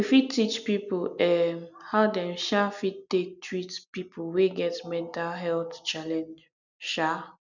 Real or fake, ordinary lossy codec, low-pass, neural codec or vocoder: real; none; 7.2 kHz; none